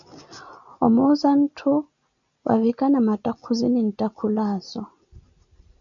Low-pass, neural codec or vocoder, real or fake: 7.2 kHz; none; real